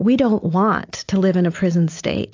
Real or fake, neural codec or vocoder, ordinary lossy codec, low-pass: real; none; AAC, 48 kbps; 7.2 kHz